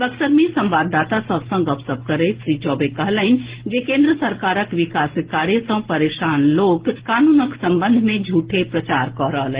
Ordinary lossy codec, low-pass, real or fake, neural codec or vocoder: Opus, 16 kbps; 3.6 kHz; real; none